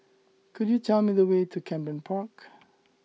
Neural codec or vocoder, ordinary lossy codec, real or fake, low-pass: none; none; real; none